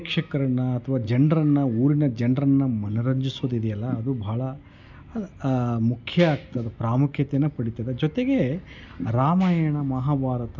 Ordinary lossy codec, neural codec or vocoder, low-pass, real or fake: none; none; 7.2 kHz; real